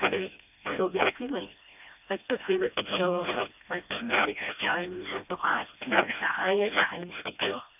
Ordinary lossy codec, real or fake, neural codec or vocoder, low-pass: none; fake; codec, 16 kHz, 1 kbps, FreqCodec, smaller model; 3.6 kHz